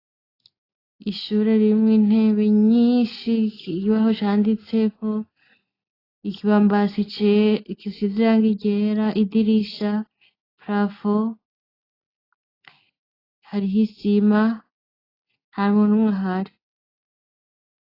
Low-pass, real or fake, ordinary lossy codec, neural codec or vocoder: 5.4 kHz; real; AAC, 24 kbps; none